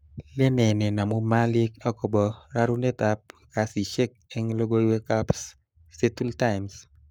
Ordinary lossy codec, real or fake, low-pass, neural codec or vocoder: none; fake; none; codec, 44.1 kHz, 7.8 kbps, Pupu-Codec